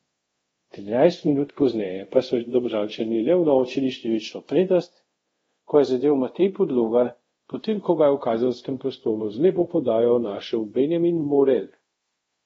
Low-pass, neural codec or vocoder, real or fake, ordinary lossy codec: 10.8 kHz; codec, 24 kHz, 0.5 kbps, DualCodec; fake; AAC, 24 kbps